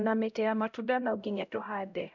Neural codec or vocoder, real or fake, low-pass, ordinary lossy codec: codec, 16 kHz, 0.5 kbps, X-Codec, HuBERT features, trained on LibriSpeech; fake; 7.2 kHz; none